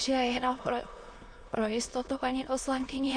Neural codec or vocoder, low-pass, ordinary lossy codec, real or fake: autoencoder, 22.05 kHz, a latent of 192 numbers a frame, VITS, trained on many speakers; 9.9 kHz; MP3, 48 kbps; fake